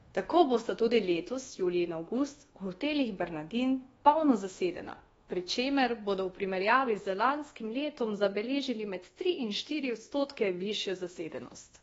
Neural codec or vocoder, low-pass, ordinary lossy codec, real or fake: codec, 24 kHz, 1.2 kbps, DualCodec; 10.8 kHz; AAC, 24 kbps; fake